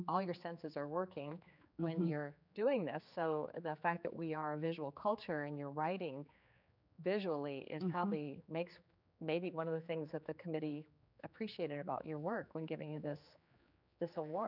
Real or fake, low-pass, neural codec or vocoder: fake; 5.4 kHz; codec, 16 kHz, 4 kbps, X-Codec, HuBERT features, trained on general audio